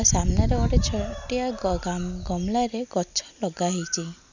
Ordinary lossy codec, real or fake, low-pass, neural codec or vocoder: none; real; 7.2 kHz; none